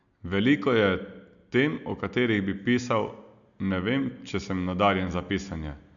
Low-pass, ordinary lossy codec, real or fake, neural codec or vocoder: 7.2 kHz; none; real; none